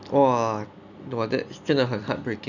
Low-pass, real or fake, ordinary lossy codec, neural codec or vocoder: 7.2 kHz; real; none; none